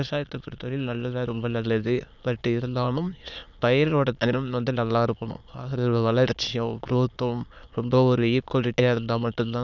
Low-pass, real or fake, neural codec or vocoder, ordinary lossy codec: 7.2 kHz; fake; autoencoder, 22.05 kHz, a latent of 192 numbers a frame, VITS, trained on many speakers; none